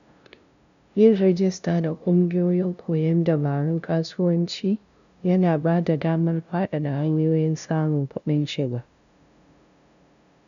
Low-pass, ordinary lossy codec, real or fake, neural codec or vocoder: 7.2 kHz; none; fake; codec, 16 kHz, 0.5 kbps, FunCodec, trained on LibriTTS, 25 frames a second